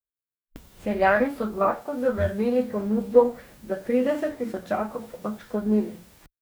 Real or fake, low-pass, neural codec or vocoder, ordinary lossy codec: fake; none; codec, 44.1 kHz, 2.6 kbps, DAC; none